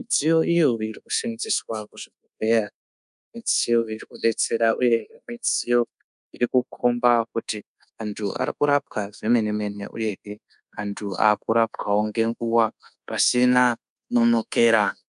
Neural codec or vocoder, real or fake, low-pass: codec, 24 kHz, 1.2 kbps, DualCodec; fake; 10.8 kHz